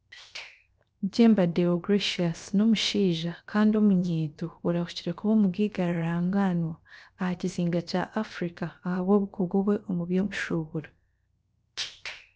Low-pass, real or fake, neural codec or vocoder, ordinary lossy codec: none; fake; codec, 16 kHz, 0.7 kbps, FocalCodec; none